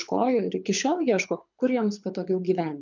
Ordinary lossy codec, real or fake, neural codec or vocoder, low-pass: MP3, 64 kbps; fake; codec, 24 kHz, 6 kbps, HILCodec; 7.2 kHz